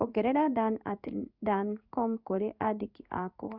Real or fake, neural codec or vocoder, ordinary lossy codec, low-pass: fake; codec, 16 kHz in and 24 kHz out, 1 kbps, XY-Tokenizer; Opus, 64 kbps; 5.4 kHz